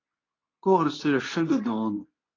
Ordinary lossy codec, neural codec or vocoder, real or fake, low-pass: AAC, 32 kbps; codec, 24 kHz, 0.9 kbps, WavTokenizer, medium speech release version 2; fake; 7.2 kHz